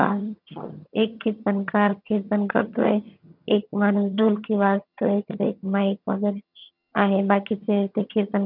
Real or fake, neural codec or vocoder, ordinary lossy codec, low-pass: fake; vocoder, 22.05 kHz, 80 mel bands, HiFi-GAN; none; 5.4 kHz